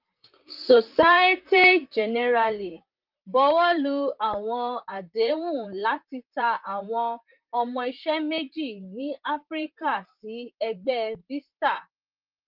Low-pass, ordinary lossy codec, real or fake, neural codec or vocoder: 5.4 kHz; Opus, 32 kbps; fake; vocoder, 44.1 kHz, 128 mel bands, Pupu-Vocoder